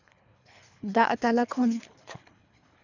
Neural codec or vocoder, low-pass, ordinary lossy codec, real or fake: codec, 24 kHz, 3 kbps, HILCodec; 7.2 kHz; none; fake